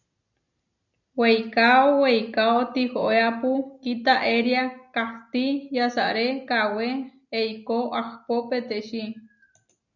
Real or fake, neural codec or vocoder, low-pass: real; none; 7.2 kHz